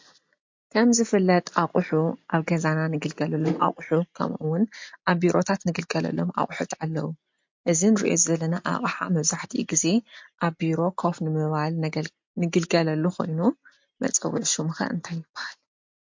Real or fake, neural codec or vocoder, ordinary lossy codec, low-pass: real; none; MP3, 48 kbps; 7.2 kHz